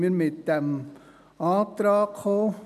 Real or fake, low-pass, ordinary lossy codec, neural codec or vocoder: real; 14.4 kHz; none; none